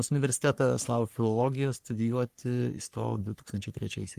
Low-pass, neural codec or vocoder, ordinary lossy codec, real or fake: 14.4 kHz; codec, 44.1 kHz, 3.4 kbps, Pupu-Codec; Opus, 32 kbps; fake